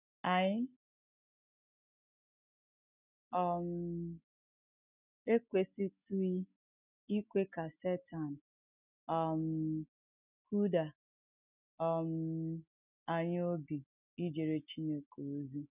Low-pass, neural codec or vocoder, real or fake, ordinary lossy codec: 3.6 kHz; none; real; none